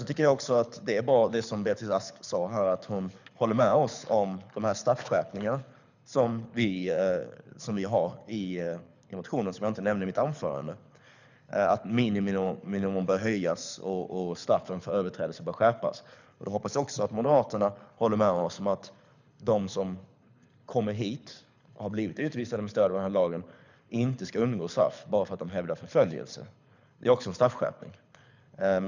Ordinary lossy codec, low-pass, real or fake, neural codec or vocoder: none; 7.2 kHz; fake; codec, 24 kHz, 6 kbps, HILCodec